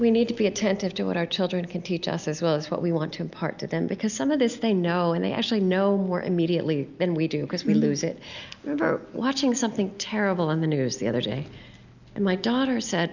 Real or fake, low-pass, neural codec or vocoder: real; 7.2 kHz; none